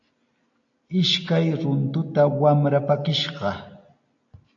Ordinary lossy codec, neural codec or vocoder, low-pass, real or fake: AAC, 48 kbps; none; 7.2 kHz; real